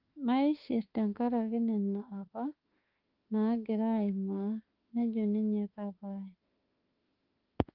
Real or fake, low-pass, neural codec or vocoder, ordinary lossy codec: fake; 5.4 kHz; autoencoder, 48 kHz, 32 numbers a frame, DAC-VAE, trained on Japanese speech; Opus, 32 kbps